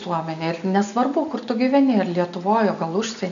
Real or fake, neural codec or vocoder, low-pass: real; none; 7.2 kHz